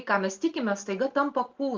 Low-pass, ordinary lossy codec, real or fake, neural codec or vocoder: 7.2 kHz; Opus, 32 kbps; real; none